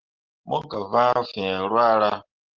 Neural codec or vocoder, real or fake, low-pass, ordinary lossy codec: none; real; 7.2 kHz; Opus, 16 kbps